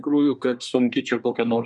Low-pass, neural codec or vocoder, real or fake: 10.8 kHz; codec, 24 kHz, 1 kbps, SNAC; fake